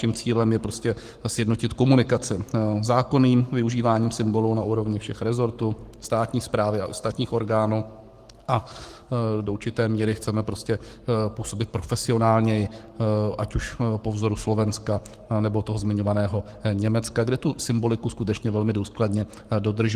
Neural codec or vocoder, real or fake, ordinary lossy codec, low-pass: codec, 44.1 kHz, 7.8 kbps, Pupu-Codec; fake; Opus, 24 kbps; 14.4 kHz